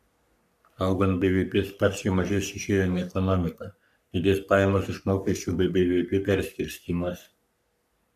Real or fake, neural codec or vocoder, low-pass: fake; codec, 44.1 kHz, 3.4 kbps, Pupu-Codec; 14.4 kHz